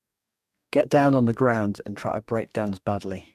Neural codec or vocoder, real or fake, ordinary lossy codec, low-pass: codec, 44.1 kHz, 2.6 kbps, DAC; fake; MP3, 96 kbps; 14.4 kHz